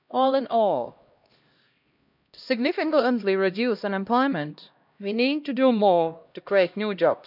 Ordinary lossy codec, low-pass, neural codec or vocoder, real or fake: none; 5.4 kHz; codec, 16 kHz, 1 kbps, X-Codec, HuBERT features, trained on LibriSpeech; fake